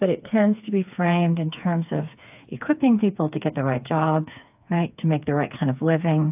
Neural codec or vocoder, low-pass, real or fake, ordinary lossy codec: codec, 16 kHz, 4 kbps, FreqCodec, smaller model; 3.6 kHz; fake; AAC, 32 kbps